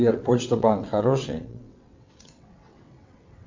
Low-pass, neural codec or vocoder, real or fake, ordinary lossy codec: 7.2 kHz; vocoder, 22.05 kHz, 80 mel bands, WaveNeXt; fake; MP3, 64 kbps